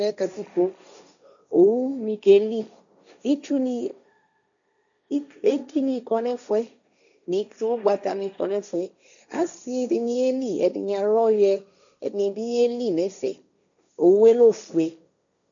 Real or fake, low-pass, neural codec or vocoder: fake; 7.2 kHz; codec, 16 kHz, 1.1 kbps, Voila-Tokenizer